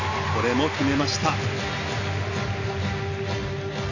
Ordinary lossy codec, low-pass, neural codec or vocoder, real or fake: none; 7.2 kHz; none; real